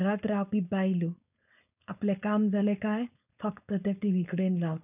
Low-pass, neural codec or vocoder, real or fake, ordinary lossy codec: 3.6 kHz; codec, 16 kHz, 4.8 kbps, FACodec; fake; none